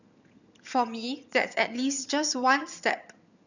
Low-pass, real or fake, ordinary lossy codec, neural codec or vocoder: 7.2 kHz; fake; none; vocoder, 22.05 kHz, 80 mel bands, HiFi-GAN